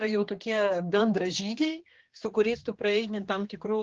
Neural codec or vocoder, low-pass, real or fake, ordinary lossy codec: codec, 16 kHz, 2 kbps, X-Codec, HuBERT features, trained on general audio; 7.2 kHz; fake; Opus, 16 kbps